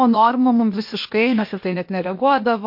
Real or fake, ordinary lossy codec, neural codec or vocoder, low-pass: fake; MP3, 32 kbps; codec, 16 kHz, 0.8 kbps, ZipCodec; 5.4 kHz